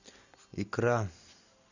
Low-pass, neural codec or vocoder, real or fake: 7.2 kHz; none; real